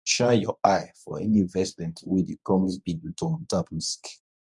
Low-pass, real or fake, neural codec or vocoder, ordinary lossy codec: none; fake; codec, 24 kHz, 0.9 kbps, WavTokenizer, medium speech release version 1; none